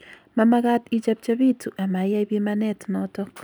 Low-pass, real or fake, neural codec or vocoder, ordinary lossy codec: none; real; none; none